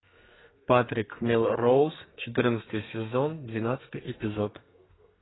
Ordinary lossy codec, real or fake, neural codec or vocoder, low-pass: AAC, 16 kbps; fake; codec, 32 kHz, 1.9 kbps, SNAC; 7.2 kHz